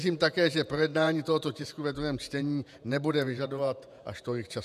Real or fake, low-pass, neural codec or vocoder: fake; 14.4 kHz; vocoder, 48 kHz, 128 mel bands, Vocos